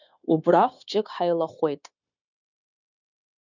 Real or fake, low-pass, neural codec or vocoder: fake; 7.2 kHz; codec, 16 kHz, 0.9 kbps, LongCat-Audio-Codec